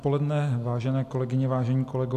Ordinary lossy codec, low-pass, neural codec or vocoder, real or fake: MP3, 96 kbps; 14.4 kHz; none; real